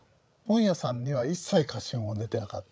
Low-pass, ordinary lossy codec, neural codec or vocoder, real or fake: none; none; codec, 16 kHz, 8 kbps, FreqCodec, larger model; fake